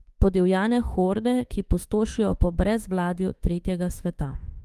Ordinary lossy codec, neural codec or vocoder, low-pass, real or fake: Opus, 24 kbps; autoencoder, 48 kHz, 32 numbers a frame, DAC-VAE, trained on Japanese speech; 14.4 kHz; fake